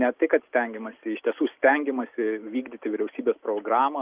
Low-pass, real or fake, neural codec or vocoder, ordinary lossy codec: 3.6 kHz; real; none; Opus, 32 kbps